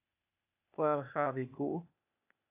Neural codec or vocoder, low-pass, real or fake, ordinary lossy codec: codec, 16 kHz, 0.8 kbps, ZipCodec; 3.6 kHz; fake; AAC, 32 kbps